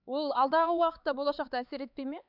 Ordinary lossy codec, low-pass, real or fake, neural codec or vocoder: none; 5.4 kHz; fake; codec, 16 kHz, 4 kbps, X-Codec, HuBERT features, trained on LibriSpeech